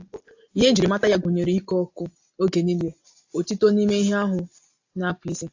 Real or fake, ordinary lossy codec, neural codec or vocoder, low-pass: real; AAC, 48 kbps; none; 7.2 kHz